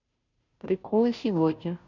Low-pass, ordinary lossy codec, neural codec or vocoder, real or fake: 7.2 kHz; none; codec, 16 kHz, 0.5 kbps, FunCodec, trained on Chinese and English, 25 frames a second; fake